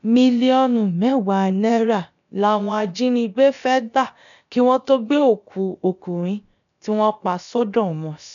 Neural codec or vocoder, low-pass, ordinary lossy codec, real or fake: codec, 16 kHz, about 1 kbps, DyCAST, with the encoder's durations; 7.2 kHz; none; fake